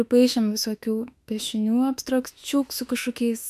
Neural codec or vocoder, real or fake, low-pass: autoencoder, 48 kHz, 32 numbers a frame, DAC-VAE, trained on Japanese speech; fake; 14.4 kHz